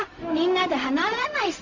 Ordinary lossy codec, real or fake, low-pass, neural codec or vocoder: MP3, 48 kbps; fake; 7.2 kHz; codec, 16 kHz, 0.4 kbps, LongCat-Audio-Codec